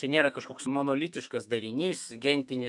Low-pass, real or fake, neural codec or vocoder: 10.8 kHz; fake; codec, 32 kHz, 1.9 kbps, SNAC